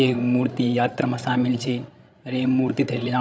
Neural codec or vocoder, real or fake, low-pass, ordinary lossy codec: codec, 16 kHz, 16 kbps, FreqCodec, larger model; fake; none; none